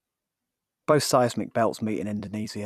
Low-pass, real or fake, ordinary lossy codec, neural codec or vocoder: 14.4 kHz; fake; none; vocoder, 44.1 kHz, 128 mel bands every 256 samples, BigVGAN v2